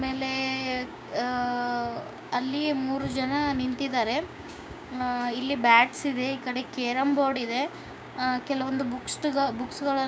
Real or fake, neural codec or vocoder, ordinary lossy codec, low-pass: fake; codec, 16 kHz, 6 kbps, DAC; none; none